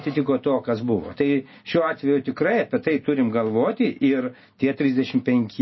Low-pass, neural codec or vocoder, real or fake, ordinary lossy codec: 7.2 kHz; none; real; MP3, 24 kbps